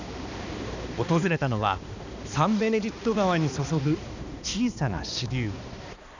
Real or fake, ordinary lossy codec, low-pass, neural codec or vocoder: fake; none; 7.2 kHz; codec, 16 kHz, 2 kbps, X-Codec, HuBERT features, trained on balanced general audio